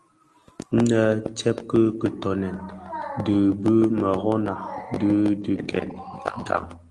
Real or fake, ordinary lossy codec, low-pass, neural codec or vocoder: real; Opus, 24 kbps; 10.8 kHz; none